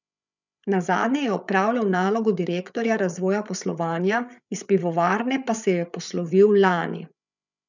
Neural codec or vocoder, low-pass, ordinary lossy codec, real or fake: codec, 16 kHz, 8 kbps, FreqCodec, larger model; 7.2 kHz; none; fake